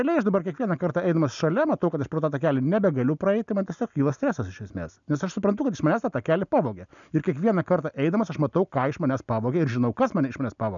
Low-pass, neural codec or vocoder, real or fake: 7.2 kHz; none; real